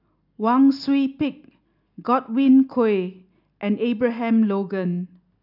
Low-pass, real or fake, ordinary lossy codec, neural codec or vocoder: 5.4 kHz; real; none; none